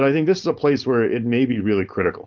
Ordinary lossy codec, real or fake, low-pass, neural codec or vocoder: Opus, 24 kbps; fake; 7.2 kHz; codec, 16 kHz, 4.8 kbps, FACodec